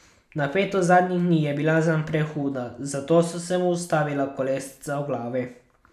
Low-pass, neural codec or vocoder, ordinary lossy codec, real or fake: 14.4 kHz; none; none; real